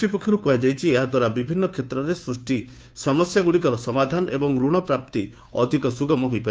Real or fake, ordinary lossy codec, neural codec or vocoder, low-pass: fake; none; codec, 16 kHz, 2 kbps, FunCodec, trained on Chinese and English, 25 frames a second; none